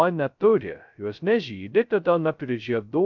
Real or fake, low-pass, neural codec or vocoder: fake; 7.2 kHz; codec, 16 kHz, 0.2 kbps, FocalCodec